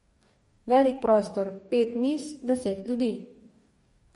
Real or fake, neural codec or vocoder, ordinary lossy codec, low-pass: fake; codec, 44.1 kHz, 2.6 kbps, DAC; MP3, 48 kbps; 19.8 kHz